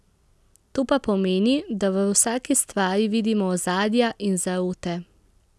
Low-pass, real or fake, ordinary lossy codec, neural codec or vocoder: none; real; none; none